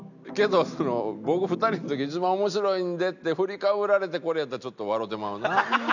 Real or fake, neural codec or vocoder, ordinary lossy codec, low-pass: real; none; none; 7.2 kHz